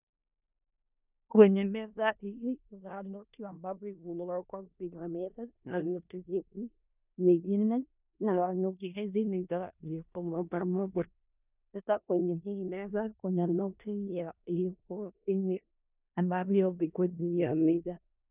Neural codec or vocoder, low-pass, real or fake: codec, 16 kHz in and 24 kHz out, 0.4 kbps, LongCat-Audio-Codec, four codebook decoder; 3.6 kHz; fake